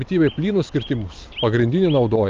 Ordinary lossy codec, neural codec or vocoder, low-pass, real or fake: Opus, 32 kbps; none; 7.2 kHz; real